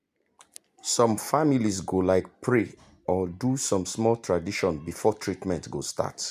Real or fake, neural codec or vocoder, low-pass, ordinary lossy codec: real; none; 14.4 kHz; MP3, 96 kbps